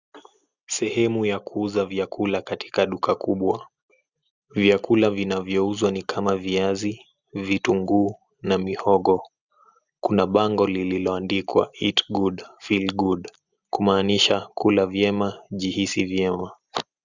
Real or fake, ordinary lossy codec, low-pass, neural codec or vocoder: real; Opus, 64 kbps; 7.2 kHz; none